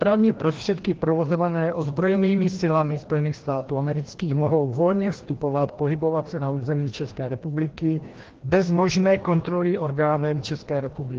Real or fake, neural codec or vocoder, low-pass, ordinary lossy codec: fake; codec, 16 kHz, 1 kbps, FreqCodec, larger model; 7.2 kHz; Opus, 16 kbps